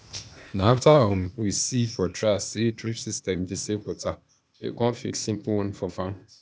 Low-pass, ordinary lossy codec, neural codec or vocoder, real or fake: none; none; codec, 16 kHz, 0.8 kbps, ZipCodec; fake